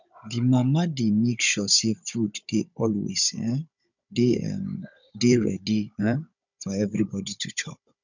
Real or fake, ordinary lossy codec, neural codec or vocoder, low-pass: fake; none; codec, 16 kHz, 16 kbps, FunCodec, trained on Chinese and English, 50 frames a second; 7.2 kHz